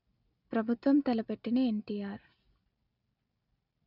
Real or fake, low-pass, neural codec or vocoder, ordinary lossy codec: real; 5.4 kHz; none; none